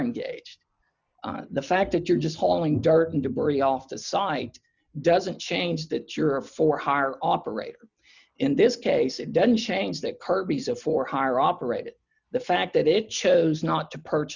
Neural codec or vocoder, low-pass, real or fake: none; 7.2 kHz; real